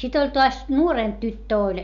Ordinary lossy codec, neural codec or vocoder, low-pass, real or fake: none; none; 7.2 kHz; real